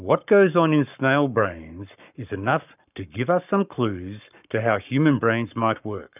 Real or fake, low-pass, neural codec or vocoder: fake; 3.6 kHz; codec, 44.1 kHz, 7.8 kbps, Pupu-Codec